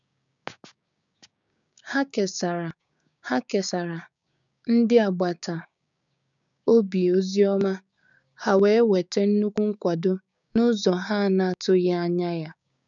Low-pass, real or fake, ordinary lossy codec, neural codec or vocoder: 7.2 kHz; fake; none; codec, 16 kHz, 6 kbps, DAC